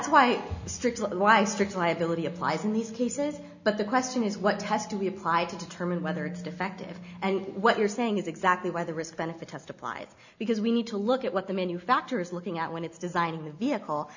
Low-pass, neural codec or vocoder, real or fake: 7.2 kHz; none; real